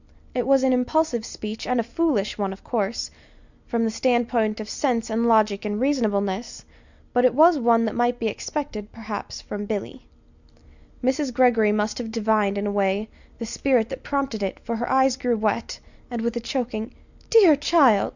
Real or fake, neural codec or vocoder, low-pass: real; none; 7.2 kHz